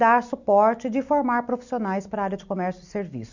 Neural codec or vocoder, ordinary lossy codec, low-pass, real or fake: none; none; 7.2 kHz; real